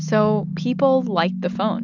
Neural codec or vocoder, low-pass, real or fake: none; 7.2 kHz; real